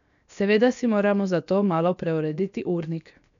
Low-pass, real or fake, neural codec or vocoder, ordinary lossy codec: 7.2 kHz; fake; codec, 16 kHz, 0.7 kbps, FocalCodec; none